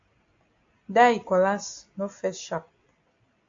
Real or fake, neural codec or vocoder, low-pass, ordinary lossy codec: real; none; 7.2 kHz; AAC, 48 kbps